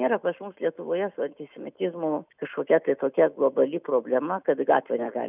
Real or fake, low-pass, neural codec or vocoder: real; 3.6 kHz; none